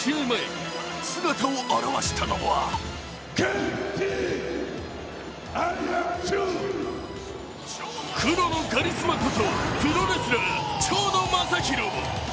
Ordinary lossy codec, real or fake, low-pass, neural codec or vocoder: none; real; none; none